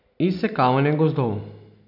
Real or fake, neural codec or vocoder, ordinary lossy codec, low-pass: real; none; none; 5.4 kHz